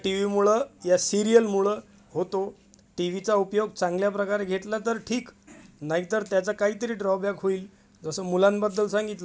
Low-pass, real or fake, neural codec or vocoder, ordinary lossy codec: none; real; none; none